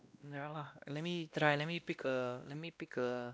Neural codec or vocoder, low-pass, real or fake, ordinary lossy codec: codec, 16 kHz, 1 kbps, X-Codec, WavLM features, trained on Multilingual LibriSpeech; none; fake; none